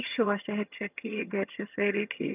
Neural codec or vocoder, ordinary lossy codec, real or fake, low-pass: vocoder, 22.05 kHz, 80 mel bands, HiFi-GAN; none; fake; 3.6 kHz